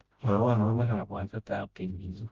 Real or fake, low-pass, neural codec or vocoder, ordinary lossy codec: fake; 7.2 kHz; codec, 16 kHz, 0.5 kbps, FreqCodec, smaller model; Opus, 32 kbps